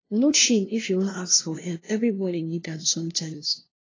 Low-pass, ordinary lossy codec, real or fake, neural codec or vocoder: 7.2 kHz; AAC, 32 kbps; fake; codec, 16 kHz, 0.5 kbps, FunCodec, trained on LibriTTS, 25 frames a second